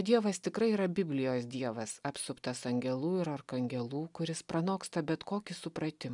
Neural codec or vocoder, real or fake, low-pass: none; real; 10.8 kHz